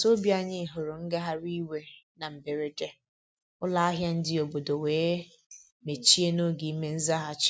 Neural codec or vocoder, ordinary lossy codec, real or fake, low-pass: none; none; real; none